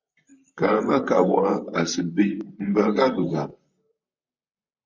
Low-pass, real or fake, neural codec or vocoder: 7.2 kHz; fake; vocoder, 44.1 kHz, 128 mel bands, Pupu-Vocoder